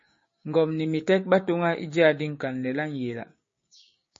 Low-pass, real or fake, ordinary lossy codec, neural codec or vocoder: 7.2 kHz; fake; MP3, 32 kbps; codec, 16 kHz, 6 kbps, DAC